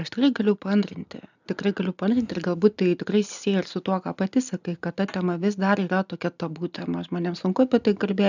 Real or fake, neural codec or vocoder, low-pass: real; none; 7.2 kHz